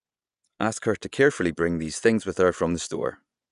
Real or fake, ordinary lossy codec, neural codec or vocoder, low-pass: fake; none; vocoder, 24 kHz, 100 mel bands, Vocos; 10.8 kHz